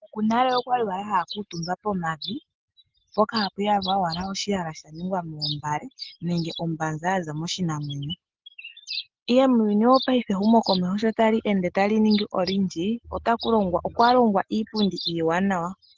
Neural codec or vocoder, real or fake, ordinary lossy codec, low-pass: none; real; Opus, 16 kbps; 7.2 kHz